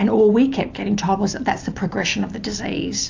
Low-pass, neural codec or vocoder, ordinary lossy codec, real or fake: 7.2 kHz; none; AAC, 48 kbps; real